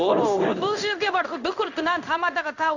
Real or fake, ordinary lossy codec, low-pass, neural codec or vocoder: fake; none; 7.2 kHz; codec, 16 kHz in and 24 kHz out, 1 kbps, XY-Tokenizer